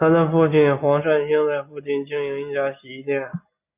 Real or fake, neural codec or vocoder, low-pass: real; none; 3.6 kHz